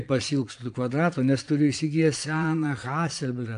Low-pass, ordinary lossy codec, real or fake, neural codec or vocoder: 9.9 kHz; AAC, 64 kbps; fake; vocoder, 22.05 kHz, 80 mel bands, Vocos